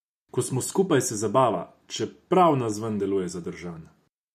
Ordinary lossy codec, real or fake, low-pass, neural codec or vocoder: MP3, 64 kbps; real; 14.4 kHz; none